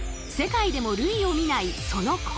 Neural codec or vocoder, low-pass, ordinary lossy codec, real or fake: none; none; none; real